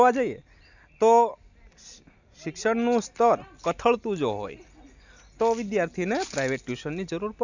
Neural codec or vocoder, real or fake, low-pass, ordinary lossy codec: none; real; 7.2 kHz; none